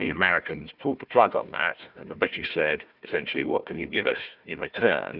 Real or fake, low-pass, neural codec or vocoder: fake; 5.4 kHz; codec, 16 kHz, 1 kbps, FunCodec, trained on Chinese and English, 50 frames a second